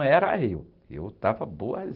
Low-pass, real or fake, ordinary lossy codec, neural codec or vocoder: 5.4 kHz; real; Opus, 16 kbps; none